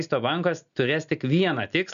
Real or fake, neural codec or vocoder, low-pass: real; none; 7.2 kHz